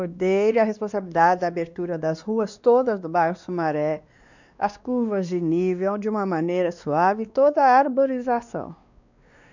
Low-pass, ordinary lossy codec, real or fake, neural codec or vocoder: 7.2 kHz; none; fake; codec, 16 kHz, 2 kbps, X-Codec, WavLM features, trained on Multilingual LibriSpeech